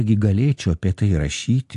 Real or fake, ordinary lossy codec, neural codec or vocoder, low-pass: real; AAC, 64 kbps; none; 10.8 kHz